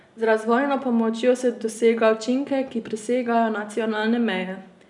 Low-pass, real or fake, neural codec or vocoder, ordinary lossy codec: 10.8 kHz; real; none; none